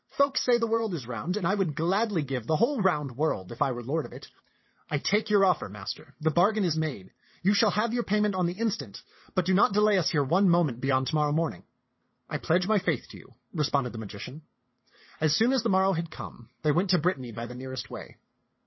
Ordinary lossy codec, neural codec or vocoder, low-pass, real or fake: MP3, 24 kbps; vocoder, 22.05 kHz, 80 mel bands, WaveNeXt; 7.2 kHz; fake